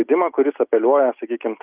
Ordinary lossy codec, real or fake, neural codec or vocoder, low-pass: Opus, 24 kbps; real; none; 3.6 kHz